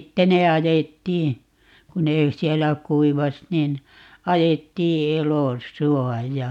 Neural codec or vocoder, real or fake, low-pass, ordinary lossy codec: none; real; 19.8 kHz; none